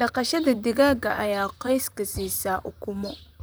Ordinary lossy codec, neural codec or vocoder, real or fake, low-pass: none; vocoder, 44.1 kHz, 128 mel bands, Pupu-Vocoder; fake; none